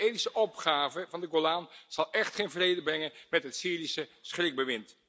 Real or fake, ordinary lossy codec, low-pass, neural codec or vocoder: real; none; none; none